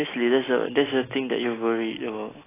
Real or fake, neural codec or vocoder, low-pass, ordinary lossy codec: real; none; 3.6 kHz; AAC, 16 kbps